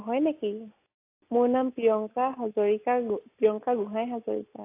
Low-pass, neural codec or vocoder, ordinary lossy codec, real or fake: 3.6 kHz; none; AAC, 24 kbps; real